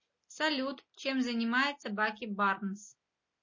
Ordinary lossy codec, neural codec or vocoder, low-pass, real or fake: MP3, 32 kbps; none; 7.2 kHz; real